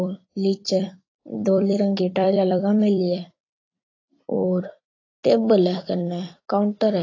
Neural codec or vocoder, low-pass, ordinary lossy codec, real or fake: vocoder, 44.1 kHz, 128 mel bands every 256 samples, BigVGAN v2; 7.2 kHz; AAC, 32 kbps; fake